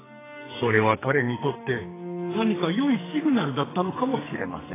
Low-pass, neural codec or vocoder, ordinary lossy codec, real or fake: 3.6 kHz; codec, 44.1 kHz, 2.6 kbps, SNAC; AAC, 16 kbps; fake